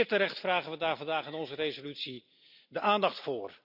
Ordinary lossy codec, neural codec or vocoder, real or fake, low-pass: none; none; real; 5.4 kHz